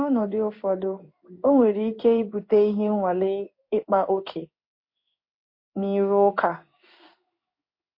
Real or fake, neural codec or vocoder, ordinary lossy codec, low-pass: fake; codec, 16 kHz in and 24 kHz out, 1 kbps, XY-Tokenizer; MP3, 32 kbps; 5.4 kHz